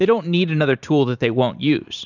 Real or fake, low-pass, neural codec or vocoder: fake; 7.2 kHz; vocoder, 44.1 kHz, 128 mel bands every 512 samples, BigVGAN v2